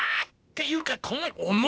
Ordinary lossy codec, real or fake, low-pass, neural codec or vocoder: none; fake; none; codec, 16 kHz, 0.8 kbps, ZipCodec